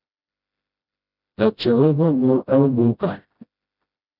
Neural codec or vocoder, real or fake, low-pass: codec, 16 kHz, 0.5 kbps, FreqCodec, smaller model; fake; 5.4 kHz